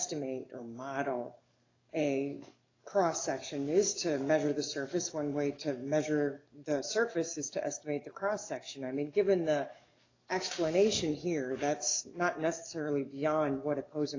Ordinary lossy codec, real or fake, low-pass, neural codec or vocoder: AAC, 32 kbps; fake; 7.2 kHz; codec, 44.1 kHz, 7.8 kbps, DAC